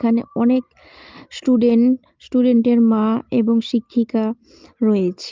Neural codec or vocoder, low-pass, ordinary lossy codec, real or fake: none; 7.2 kHz; Opus, 32 kbps; real